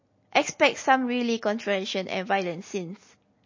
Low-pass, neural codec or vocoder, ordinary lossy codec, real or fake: 7.2 kHz; none; MP3, 32 kbps; real